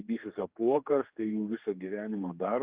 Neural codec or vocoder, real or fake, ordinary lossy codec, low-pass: codec, 16 kHz, 2 kbps, X-Codec, HuBERT features, trained on general audio; fake; Opus, 24 kbps; 3.6 kHz